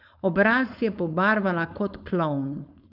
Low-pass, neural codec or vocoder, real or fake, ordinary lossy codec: 5.4 kHz; codec, 16 kHz, 4.8 kbps, FACodec; fake; none